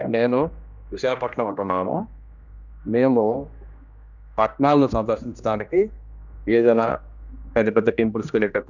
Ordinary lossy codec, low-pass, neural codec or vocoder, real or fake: none; 7.2 kHz; codec, 16 kHz, 1 kbps, X-Codec, HuBERT features, trained on general audio; fake